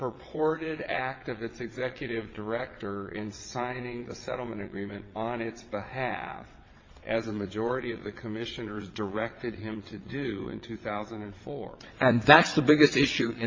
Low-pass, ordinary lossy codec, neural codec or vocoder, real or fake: 7.2 kHz; MP3, 32 kbps; vocoder, 22.05 kHz, 80 mel bands, WaveNeXt; fake